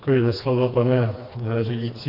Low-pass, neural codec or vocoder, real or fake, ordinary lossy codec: 5.4 kHz; codec, 16 kHz, 2 kbps, FreqCodec, smaller model; fake; AAC, 32 kbps